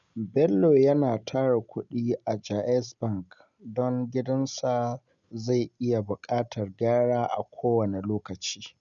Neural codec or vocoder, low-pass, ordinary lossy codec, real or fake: none; 7.2 kHz; none; real